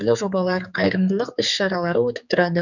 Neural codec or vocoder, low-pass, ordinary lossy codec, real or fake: codec, 16 kHz, 4 kbps, X-Codec, HuBERT features, trained on general audio; 7.2 kHz; none; fake